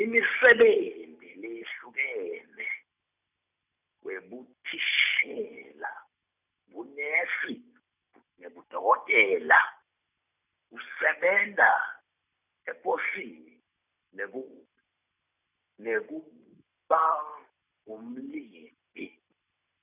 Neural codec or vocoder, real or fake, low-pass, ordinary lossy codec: none; real; 3.6 kHz; none